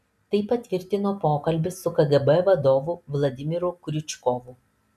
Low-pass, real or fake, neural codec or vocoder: 14.4 kHz; real; none